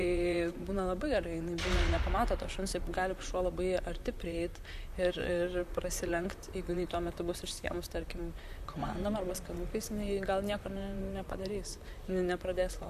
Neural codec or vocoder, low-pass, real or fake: vocoder, 44.1 kHz, 128 mel bands, Pupu-Vocoder; 14.4 kHz; fake